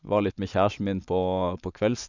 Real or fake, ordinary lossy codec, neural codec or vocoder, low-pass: fake; none; vocoder, 24 kHz, 100 mel bands, Vocos; 7.2 kHz